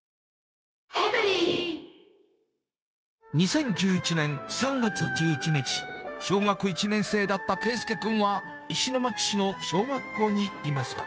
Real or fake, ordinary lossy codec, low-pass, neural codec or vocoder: fake; none; none; codec, 16 kHz, 0.9 kbps, LongCat-Audio-Codec